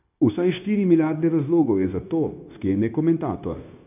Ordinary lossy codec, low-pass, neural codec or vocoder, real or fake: none; 3.6 kHz; codec, 16 kHz, 0.9 kbps, LongCat-Audio-Codec; fake